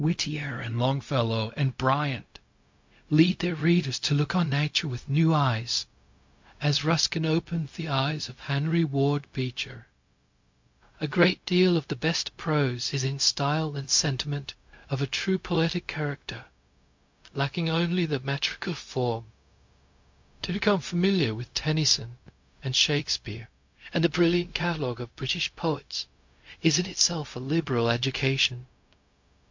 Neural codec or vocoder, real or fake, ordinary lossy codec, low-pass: codec, 16 kHz, 0.4 kbps, LongCat-Audio-Codec; fake; MP3, 48 kbps; 7.2 kHz